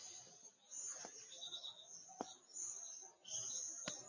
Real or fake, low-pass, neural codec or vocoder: real; 7.2 kHz; none